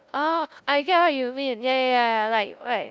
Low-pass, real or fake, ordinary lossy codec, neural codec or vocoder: none; fake; none; codec, 16 kHz, 0.5 kbps, FunCodec, trained on LibriTTS, 25 frames a second